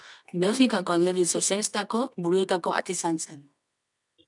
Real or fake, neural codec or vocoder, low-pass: fake; codec, 24 kHz, 0.9 kbps, WavTokenizer, medium music audio release; 10.8 kHz